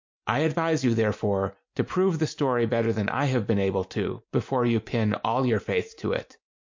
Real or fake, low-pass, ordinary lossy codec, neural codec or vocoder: real; 7.2 kHz; MP3, 48 kbps; none